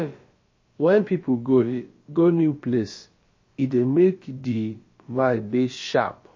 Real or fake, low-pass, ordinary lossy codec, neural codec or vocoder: fake; 7.2 kHz; MP3, 32 kbps; codec, 16 kHz, about 1 kbps, DyCAST, with the encoder's durations